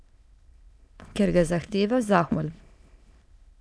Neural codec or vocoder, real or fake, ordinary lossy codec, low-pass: autoencoder, 22.05 kHz, a latent of 192 numbers a frame, VITS, trained on many speakers; fake; none; none